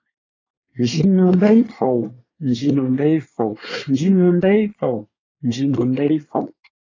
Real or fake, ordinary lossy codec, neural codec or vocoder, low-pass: fake; AAC, 32 kbps; codec, 24 kHz, 1 kbps, SNAC; 7.2 kHz